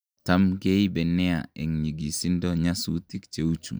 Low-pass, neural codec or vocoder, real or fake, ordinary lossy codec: none; none; real; none